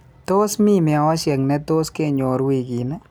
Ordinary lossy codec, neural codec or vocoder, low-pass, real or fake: none; none; none; real